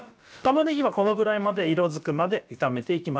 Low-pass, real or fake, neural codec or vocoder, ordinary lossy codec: none; fake; codec, 16 kHz, about 1 kbps, DyCAST, with the encoder's durations; none